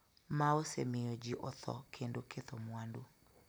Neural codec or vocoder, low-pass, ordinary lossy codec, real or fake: none; none; none; real